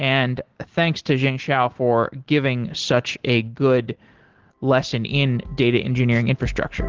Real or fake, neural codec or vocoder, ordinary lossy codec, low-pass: real; none; Opus, 16 kbps; 7.2 kHz